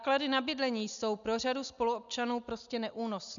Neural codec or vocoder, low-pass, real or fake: none; 7.2 kHz; real